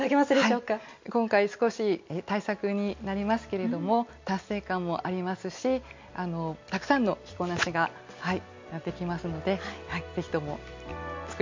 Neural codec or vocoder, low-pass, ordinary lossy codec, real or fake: none; 7.2 kHz; MP3, 48 kbps; real